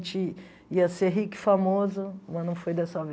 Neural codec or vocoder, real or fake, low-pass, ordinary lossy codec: none; real; none; none